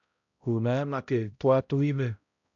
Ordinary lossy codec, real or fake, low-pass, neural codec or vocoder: AAC, 64 kbps; fake; 7.2 kHz; codec, 16 kHz, 0.5 kbps, X-Codec, HuBERT features, trained on balanced general audio